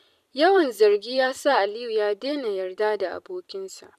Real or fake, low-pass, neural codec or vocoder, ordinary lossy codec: fake; 14.4 kHz; vocoder, 44.1 kHz, 128 mel bands every 256 samples, BigVGAN v2; none